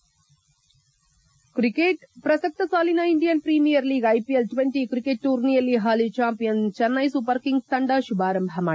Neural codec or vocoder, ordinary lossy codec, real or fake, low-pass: none; none; real; none